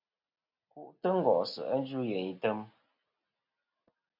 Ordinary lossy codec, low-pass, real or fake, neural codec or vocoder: MP3, 48 kbps; 5.4 kHz; real; none